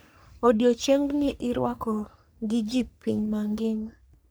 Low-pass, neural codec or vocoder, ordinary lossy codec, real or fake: none; codec, 44.1 kHz, 3.4 kbps, Pupu-Codec; none; fake